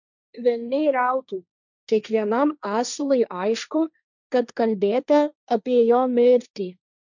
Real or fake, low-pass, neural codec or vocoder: fake; 7.2 kHz; codec, 16 kHz, 1.1 kbps, Voila-Tokenizer